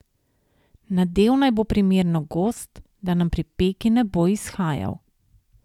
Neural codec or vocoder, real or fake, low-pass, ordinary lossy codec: none; real; 19.8 kHz; none